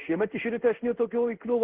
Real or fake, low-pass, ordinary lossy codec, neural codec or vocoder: fake; 3.6 kHz; Opus, 16 kbps; codec, 16 kHz in and 24 kHz out, 1 kbps, XY-Tokenizer